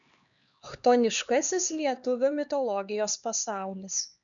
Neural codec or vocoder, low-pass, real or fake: codec, 16 kHz, 2 kbps, X-Codec, HuBERT features, trained on LibriSpeech; 7.2 kHz; fake